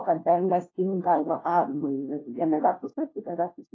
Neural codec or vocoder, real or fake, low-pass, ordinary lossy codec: codec, 16 kHz, 1 kbps, FunCodec, trained on LibriTTS, 50 frames a second; fake; 7.2 kHz; AAC, 32 kbps